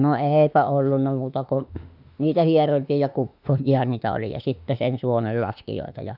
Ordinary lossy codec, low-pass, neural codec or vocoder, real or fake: none; 5.4 kHz; autoencoder, 48 kHz, 32 numbers a frame, DAC-VAE, trained on Japanese speech; fake